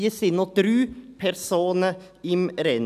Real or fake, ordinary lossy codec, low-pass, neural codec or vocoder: real; none; 14.4 kHz; none